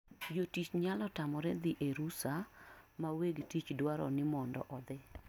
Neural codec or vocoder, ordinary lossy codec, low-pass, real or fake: none; none; 19.8 kHz; real